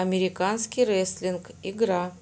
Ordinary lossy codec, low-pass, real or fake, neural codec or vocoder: none; none; real; none